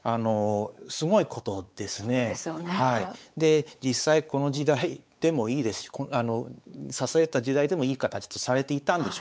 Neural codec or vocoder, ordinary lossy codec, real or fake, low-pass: codec, 16 kHz, 4 kbps, X-Codec, WavLM features, trained on Multilingual LibriSpeech; none; fake; none